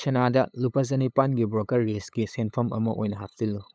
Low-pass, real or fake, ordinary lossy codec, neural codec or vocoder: none; fake; none; codec, 16 kHz, 8 kbps, FunCodec, trained on LibriTTS, 25 frames a second